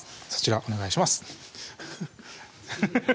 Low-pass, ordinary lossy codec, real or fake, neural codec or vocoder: none; none; real; none